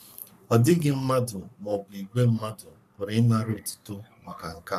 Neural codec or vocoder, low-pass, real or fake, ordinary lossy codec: codec, 44.1 kHz, 3.4 kbps, Pupu-Codec; 14.4 kHz; fake; none